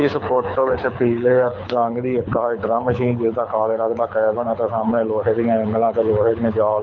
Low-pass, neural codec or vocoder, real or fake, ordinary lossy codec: 7.2 kHz; codec, 24 kHz, 6 kbps, HILCodec; fake; none